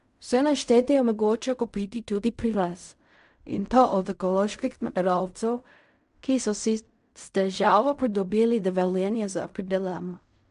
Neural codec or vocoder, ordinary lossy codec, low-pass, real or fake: codec, 16 kHz in and 24 kHz out, 0.4 kbps, LongCat-Audio-Codec, fine tuned four codebook decoder; MP3, 64 kbps; 10.8 kHz; fake